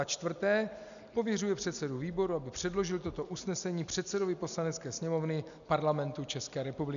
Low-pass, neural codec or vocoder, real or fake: 7.2 kHz; none; real